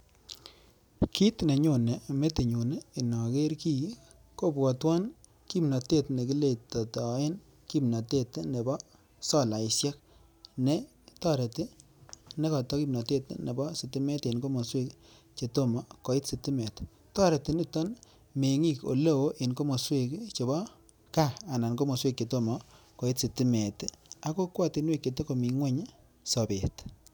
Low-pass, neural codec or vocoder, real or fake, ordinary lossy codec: none; none; real; none